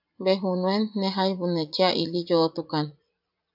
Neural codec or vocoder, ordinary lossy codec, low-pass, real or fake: vocoder, 44.1 kHz, 80 mel bands, Vocos; AAC, 48 kbps; 5.4 kHz; fake